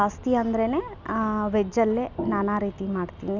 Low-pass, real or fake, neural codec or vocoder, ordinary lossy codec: 7.2 kHz; real; none; none